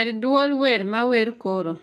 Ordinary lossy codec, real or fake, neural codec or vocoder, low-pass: none; fake; codec, 44.1 kHz, 2.6 kbps, SNAC; 14.4 kHz